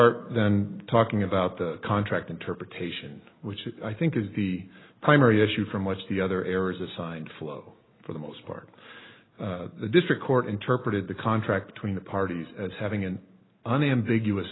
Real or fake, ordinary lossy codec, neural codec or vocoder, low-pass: real; AAC, 16 kbps; none; 7.2 kHz